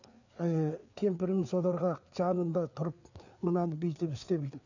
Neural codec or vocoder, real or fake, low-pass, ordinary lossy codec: codec, 16 kHz, 4 kbps, FreqCodec, larger model; fake; 7.2 kHz; none